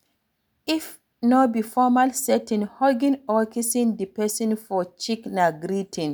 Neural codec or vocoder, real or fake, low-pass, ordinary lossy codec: none; real; none; none